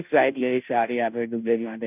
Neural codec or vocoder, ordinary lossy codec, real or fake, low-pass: codec, 16 kHz, 0.5 kbps, FunCodec, trained on Chinese and English, 25 frames a second; none; fake; 3.6 kHz